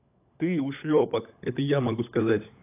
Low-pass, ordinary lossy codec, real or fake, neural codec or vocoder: 3.6 kHz; AAC, 24 kbps; fake; codec, 16 kHz, 16 kbps, FunCodec, trained on LibriTTS, 50 frames a second